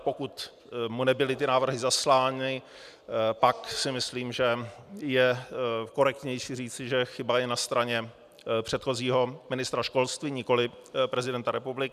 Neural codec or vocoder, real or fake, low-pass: none; real; 14.4 kHz